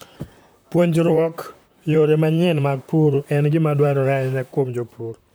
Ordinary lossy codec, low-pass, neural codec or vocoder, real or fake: none; none; vocoder, 44.1 kHz, 128 mel bands, Pupu-Vocoder; fake